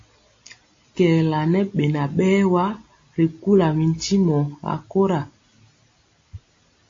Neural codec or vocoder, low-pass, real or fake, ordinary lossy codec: none; 7.2 kHz; real; AAC, 48 kbps